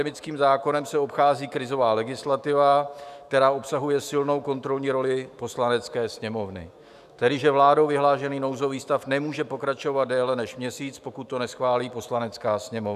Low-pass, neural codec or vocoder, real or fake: 14.4 kHz; autoencoder, 48 kHz, 128 numbers a frame, DAC-VAE, trained on Japanese speech; fake